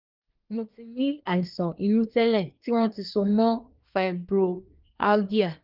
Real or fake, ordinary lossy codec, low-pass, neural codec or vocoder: fake; Opus, 24 kbps; 5.4 kHz; codec, 24 kHz, 1 kbps, SNAC